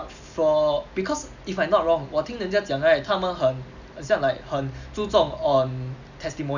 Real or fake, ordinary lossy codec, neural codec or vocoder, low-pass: real; none; none; 7.2 kHz